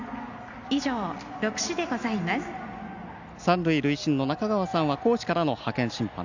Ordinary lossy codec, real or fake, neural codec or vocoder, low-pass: none; real; none; 7.2 kHz